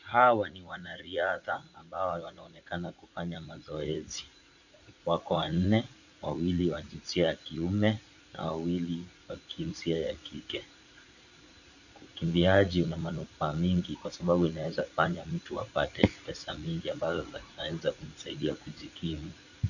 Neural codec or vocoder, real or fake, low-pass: vocoder, 44.1 kHz, 80 mel bands, Vocos; fake; 7.2 kHz